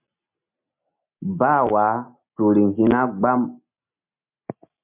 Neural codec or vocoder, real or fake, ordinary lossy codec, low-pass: none; real; MP3, 32 kbps; 3.6 kHz